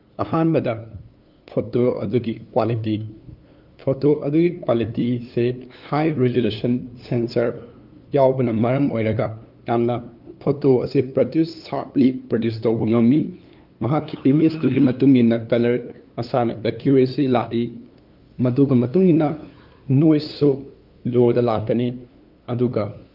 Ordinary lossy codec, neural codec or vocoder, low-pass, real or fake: Opus, 24 kbps; codec, 16 kHz, 2 kbps, FunCodec, trained on LibriTTS, 25 frames a second; 5.4 kHz; fake